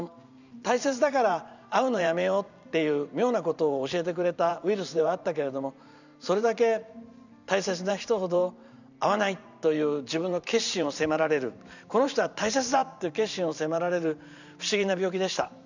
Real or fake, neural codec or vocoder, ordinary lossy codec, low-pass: fake; vocoder, 44.1 kHz, 128 mel bands every 512 samples, BigVGAN v2; none; 7.2 kHz